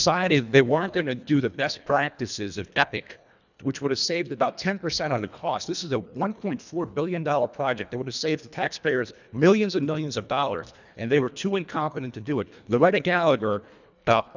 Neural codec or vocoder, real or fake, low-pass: codec, 24 kHz, 1.5 kbps, HILCodec; fake; 7.2 kHz